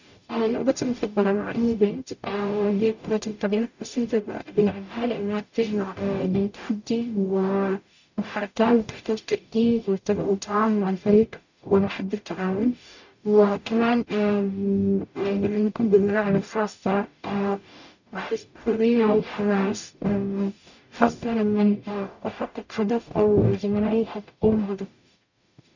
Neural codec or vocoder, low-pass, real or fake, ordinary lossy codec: codec, 44.1 kHz, 0.9 kbps, DAC; 7.2 kHz; fake; none